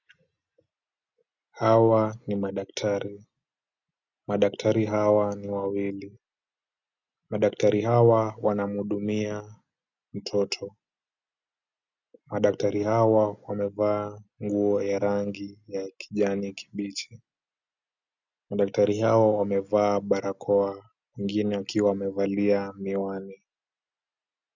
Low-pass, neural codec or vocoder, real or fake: 7.2 kHz; none; real